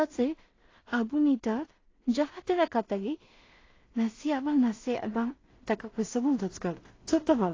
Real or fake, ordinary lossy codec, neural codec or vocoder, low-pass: fake; AAC, 32 kbps; codec, 16 kHz in and 24 kHz out, 0.4 kbps, LongCat-Audio-Codec, two codebook decoder; 7.2 kHz